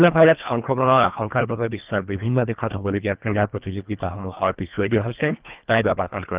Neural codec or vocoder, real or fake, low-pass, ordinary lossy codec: codec, 24 kHz, 1.5 kbps, HILCodec; fake; 3.6 kHz; Opus, 24 kbps